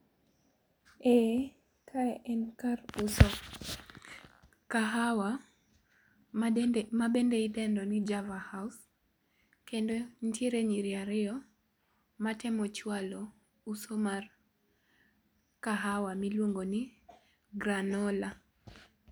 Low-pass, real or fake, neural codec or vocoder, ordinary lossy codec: none; real; none; none